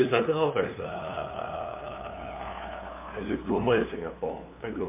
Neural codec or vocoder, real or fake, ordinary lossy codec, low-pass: codec, 16 kHz, 2 kbps, FunCodec, trained on LibriTTS, 25 frames a second; fake; MP3, 32 kbps; 3.6 kHz